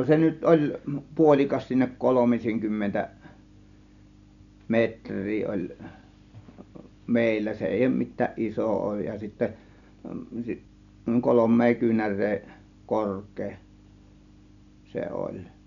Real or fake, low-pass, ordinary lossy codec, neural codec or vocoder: real; 7.2 kHz; none; none